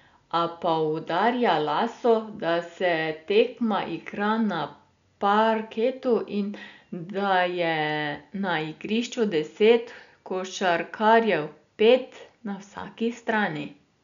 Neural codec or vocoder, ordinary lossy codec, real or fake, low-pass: none; MP3, 96 kbps; real; 7.2 kHz